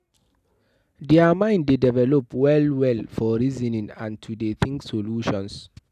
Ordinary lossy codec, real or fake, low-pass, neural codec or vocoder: none; real; 14.4 kHz; none